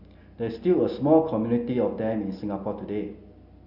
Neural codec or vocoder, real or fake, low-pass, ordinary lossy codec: none; real; 5.4 kHz; none